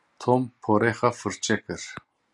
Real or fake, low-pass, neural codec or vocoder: real; 10.8 kHz; none